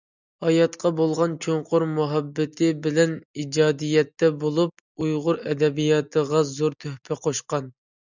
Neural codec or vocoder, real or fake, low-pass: none; real; 7.2 kHz